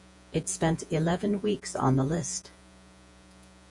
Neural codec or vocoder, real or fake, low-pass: vocoder, 48 kHz, 128 mel bands, Vocos; fake; 10.8 kHz